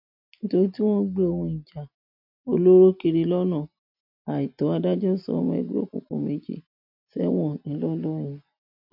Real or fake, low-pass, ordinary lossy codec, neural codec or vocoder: real; 5.4 kHz; MP3, 48 kbps; none